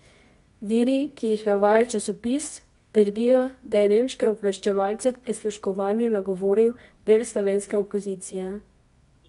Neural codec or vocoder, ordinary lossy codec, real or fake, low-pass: codec, 24 kHz, 0.9 kbps, WavTokenizer, medium music audio release; MP3, 64 kbps; fake; 10.8 kHz